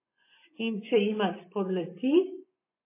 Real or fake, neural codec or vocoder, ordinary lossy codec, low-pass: fake; vocoder, 24 kHz, 100 mel bands, Vocos; MP3, 24 kbps; 3.6 kHz